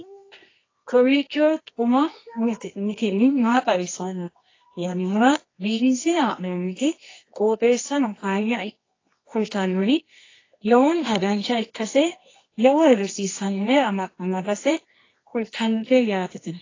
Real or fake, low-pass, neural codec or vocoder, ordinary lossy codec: fake; 7.2 kHz; codec, 24 kHz, 0.9 kbps, WavTokenizer, medium music audio release; AAC, 32 kbps